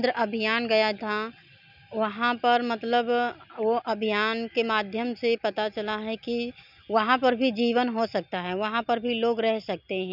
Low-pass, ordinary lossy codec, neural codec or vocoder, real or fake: 5.4 kHz; none; none; real